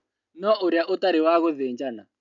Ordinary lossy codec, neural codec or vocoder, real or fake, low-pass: none; none; real; 7.2 kHz